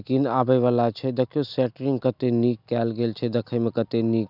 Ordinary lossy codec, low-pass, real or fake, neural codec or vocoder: none; 5.4 kHz; real; none